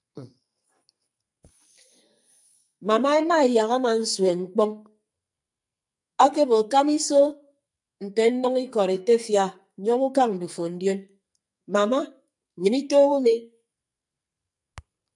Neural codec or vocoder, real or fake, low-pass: codec, 44.1 kHz, 2.6 kbps, SNAC; fake; 10.8 kHz